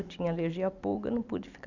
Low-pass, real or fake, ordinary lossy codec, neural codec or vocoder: 7.2 kHz; fake; none; vocoder, 44.1 kHz, 128 mel bands every 256 samples, BigVGAN v2